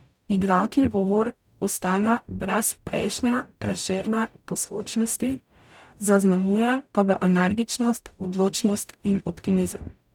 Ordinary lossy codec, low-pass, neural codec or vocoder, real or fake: none; 19.8 kHz; codec, 44.1 kHz, 0.9 kbps, DAC; fake